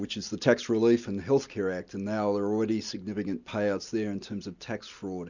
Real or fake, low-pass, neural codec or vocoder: real; 7.2 kHz; none